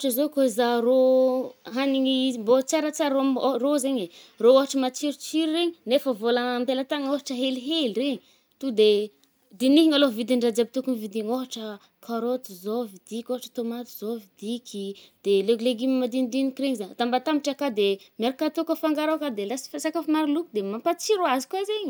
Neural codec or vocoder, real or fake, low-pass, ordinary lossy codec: none; real; none; none